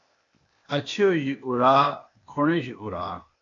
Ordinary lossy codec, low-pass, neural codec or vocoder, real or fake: AAC, 32 kbps; 7.2 kHz; codec, 16 kHz, 0.8 kbps, ZipCodec; fake